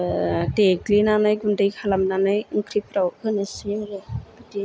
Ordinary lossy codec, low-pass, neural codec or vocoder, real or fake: none; none; none; real